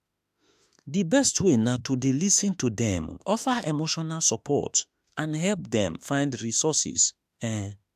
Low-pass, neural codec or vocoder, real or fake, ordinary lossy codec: 14.4 kHz; autoencoder, 48 kHz, 32 numbers a frame, DAC-VAE, trained on Japanese speech; fake; AAC, 96 kbps